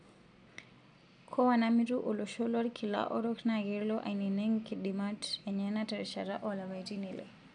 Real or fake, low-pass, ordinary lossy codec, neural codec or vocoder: real; 9.9 kHz; none; none